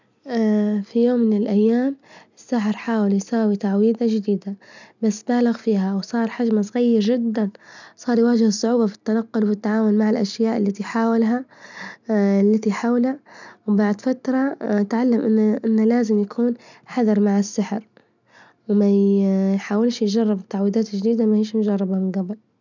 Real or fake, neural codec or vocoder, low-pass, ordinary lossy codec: real; none; 7.2 kHz; none